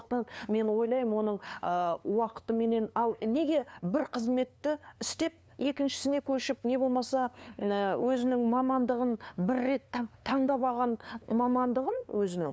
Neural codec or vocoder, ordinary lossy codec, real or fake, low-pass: codec, 16 kHz, 2 kbps, FunCodec, trained on LibriTTS, 25 frames a second; none; fake; none